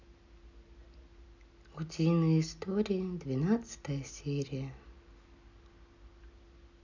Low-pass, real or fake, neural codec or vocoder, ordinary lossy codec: 7.2 kHz; real; none; none